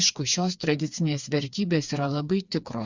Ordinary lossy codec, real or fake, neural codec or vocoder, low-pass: Opus, 64 kbps; fake; codec, 16 kHz, 4 kbps, FreqCodec, smaller model; 7.2 kHz